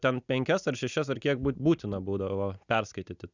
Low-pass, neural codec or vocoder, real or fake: 7.2 kHz; none; real